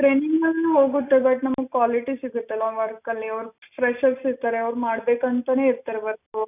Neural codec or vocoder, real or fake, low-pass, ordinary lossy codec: none; real; 3.6 kHz; none